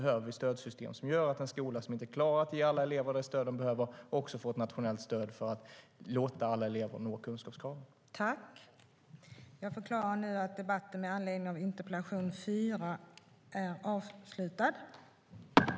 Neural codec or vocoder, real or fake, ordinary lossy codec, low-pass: none; real; none; none